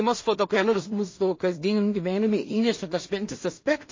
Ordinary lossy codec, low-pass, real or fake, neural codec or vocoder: MP3, 32 kbps; 7.2 kHz; fake; codec, 16 kHz in and 24 kHz out, 0.4 kbps, LongCat-Audio-Codec, two codebook decoder